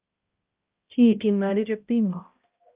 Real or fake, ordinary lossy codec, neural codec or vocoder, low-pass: fake; Opus, 24 kbps; codec, 16 kHz, 0.5 kbps, X-Codec, HuBERT features, trained on balanced general audio; 3.6 kHz